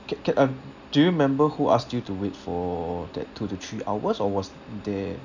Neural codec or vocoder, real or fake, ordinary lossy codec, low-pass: none; real; none; 7.2 kHz